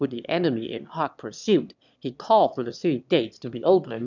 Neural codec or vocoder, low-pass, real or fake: autoencoder, 22.05 kHz, a latent of 192 numbers a frame, VITS, trained on one speaker; 7.2 kHz; fake